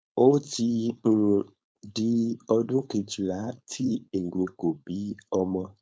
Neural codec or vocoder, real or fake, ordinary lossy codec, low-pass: codec, 16 kHz, 4.8 kbps, FACodec; fake; none; none